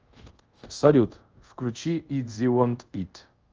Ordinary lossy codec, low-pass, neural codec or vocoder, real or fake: Opus, 24 kbps; 7.2 kHz; codec, 24 kHz, 0.5 kbps, DualCodec; fake